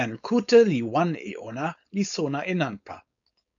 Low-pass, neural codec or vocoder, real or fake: 7.2 kHz; codec, 16 kHz, 4.8 kbps, FACodec; fake